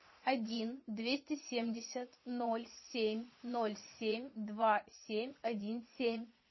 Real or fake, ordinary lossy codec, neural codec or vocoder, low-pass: fake; MP3, 24 kbps; vocoder, 22.05 kHz, 80 mel bands, Vocos; 7.2 kHz